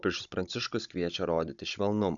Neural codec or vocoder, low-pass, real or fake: codec, 16 kHz, 16 kbps, FunCodec, trained on Chinese and English, 50 frames a second; 7.2 kHz; fake